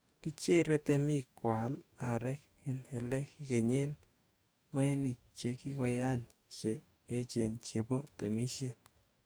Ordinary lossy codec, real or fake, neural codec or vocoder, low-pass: none; fake; codec, 44.1 kHz, 2.6 kbps, DAC; none